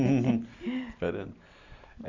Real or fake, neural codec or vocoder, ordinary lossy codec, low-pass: real; none; Opus, 64 kbps; 7.2 kHz